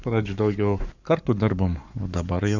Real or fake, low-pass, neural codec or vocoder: fake; 7.2 kHz; codec, 44.1 kHz, 7.8 kbps, Pupu-Codec